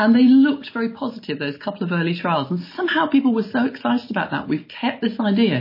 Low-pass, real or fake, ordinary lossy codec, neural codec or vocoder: 5.4 kHz; real; MP3, 24 kbps; none